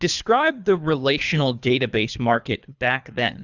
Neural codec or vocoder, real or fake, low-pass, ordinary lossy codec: codec, 24 kHz, 3 kbps, HILCodec; fake; 7.2 kHz; Opus, 64 kbps